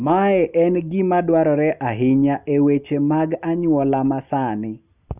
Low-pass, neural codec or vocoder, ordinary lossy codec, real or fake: 3.6 kHz; none; none; real